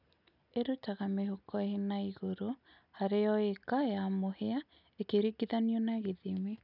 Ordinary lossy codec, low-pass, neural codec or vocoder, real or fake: none; 5.4 kHz; none; real